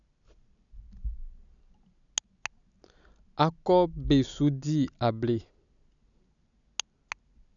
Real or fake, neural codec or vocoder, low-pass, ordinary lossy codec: real; none; 7.2 kHz; none